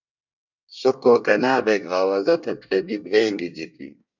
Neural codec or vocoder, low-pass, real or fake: codec, 24 kHz, 1 kbps, SNAC; 7.2 kHz; fake